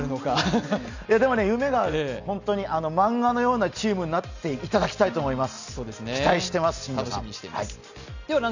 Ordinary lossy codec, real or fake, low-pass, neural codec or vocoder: none; real; 7.2 kHz; none